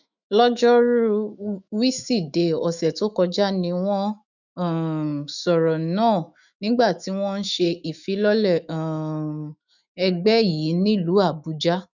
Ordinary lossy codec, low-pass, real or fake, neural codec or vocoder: none; 7.2 kHz; fake; autoencoder, 48 kHz, 128 numbers a frame, DAC-VAE, trained on Japanese speech